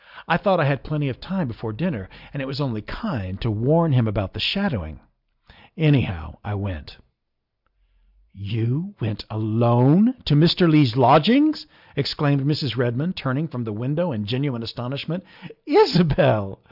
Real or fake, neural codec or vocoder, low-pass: real; none; 5.4 kHz